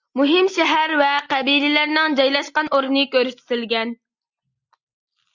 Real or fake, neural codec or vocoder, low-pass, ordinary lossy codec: real; none; 7.2 kHz; Opus, 64 kbps